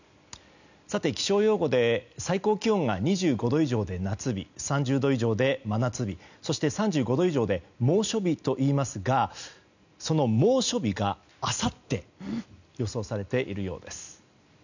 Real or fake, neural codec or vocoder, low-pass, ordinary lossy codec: real; none; 7.2 kHz; none